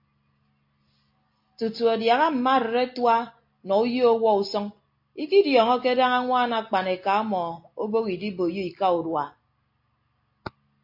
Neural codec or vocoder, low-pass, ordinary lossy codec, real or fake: none; 5.4 kHz; MP3, 32 kbps; real